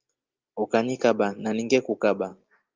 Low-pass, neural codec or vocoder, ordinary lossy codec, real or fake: 7.2 kHz; none; Opus, 24 kbps; real